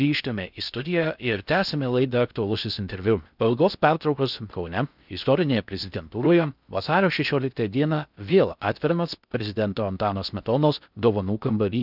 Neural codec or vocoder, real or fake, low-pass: codec, 16 kHz in and 24 kHz out, 0.6 kbps, FocalCodec, streaming, 2048 codes; fake; 5.4 kHz